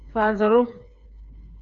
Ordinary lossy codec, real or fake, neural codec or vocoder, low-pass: AAC, 64 kbps; fake; codec, 16 kHz, 4 kbps, FreqCodec, smaller model; 7.2 kHz